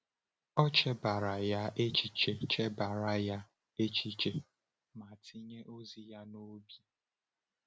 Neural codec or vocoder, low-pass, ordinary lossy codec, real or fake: none; none; none; real